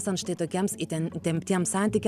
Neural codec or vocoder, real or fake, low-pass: none; real; 14.4 kHz